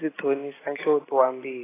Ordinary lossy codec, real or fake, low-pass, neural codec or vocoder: AAC, 16 kbps; real; 3.6 kHz; none